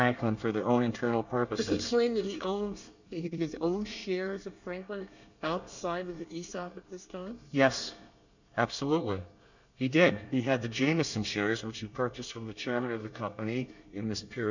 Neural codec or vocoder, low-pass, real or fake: codec, 24 kHz, 1 kbps, SNAC; 7.2 kHz; fake